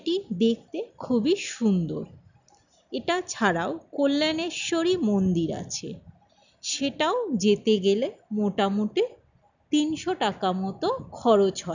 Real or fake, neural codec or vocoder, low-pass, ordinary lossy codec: real; none; 7.2 kHz; none